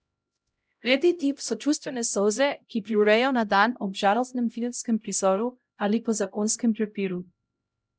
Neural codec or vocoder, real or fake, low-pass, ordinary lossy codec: codec, 16 kHz, 0.5 kbps, X-Codec, HuBERT features, trained on LibriSpeech; fake; none; none